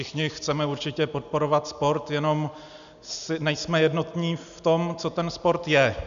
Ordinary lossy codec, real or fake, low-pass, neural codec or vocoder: AAC, 96 kbps; real; 7.2 kHz; none